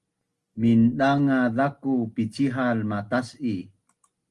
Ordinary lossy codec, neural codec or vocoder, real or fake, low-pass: Opus, 24 kbps; none; real; 10.8 kHz